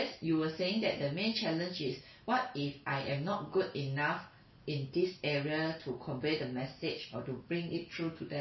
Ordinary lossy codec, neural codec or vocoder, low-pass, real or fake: MP3, 24 kbps; none; 7.2 kHz; real